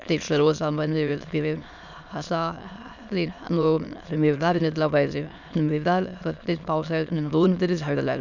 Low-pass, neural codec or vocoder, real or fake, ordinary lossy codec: 7.2 kHz; autoencoder, 22.05 kHz, a latent of 192 numbers a frame, VITS, trained on many speakers; fake; none